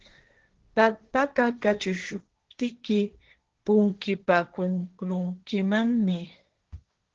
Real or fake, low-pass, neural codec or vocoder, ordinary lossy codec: fake; 7.2 kHz; codec, 16 kHz, 1.1 kbps, Voila-Tokenizer; Opus, 16 kbps